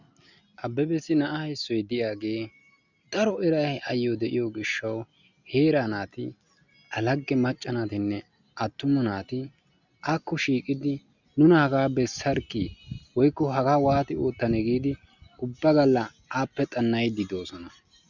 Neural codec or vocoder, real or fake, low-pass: none; real; 7.2 kHz